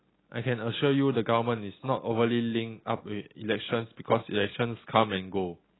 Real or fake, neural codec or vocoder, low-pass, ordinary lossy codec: real; none; 7.2 kHz; AAC, 16 kbps